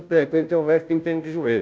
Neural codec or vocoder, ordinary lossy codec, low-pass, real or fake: codec, 16 kHz, 0.5 kbps, FunCodec, trained on Chinese and English, 25 frames a second; none; none; fake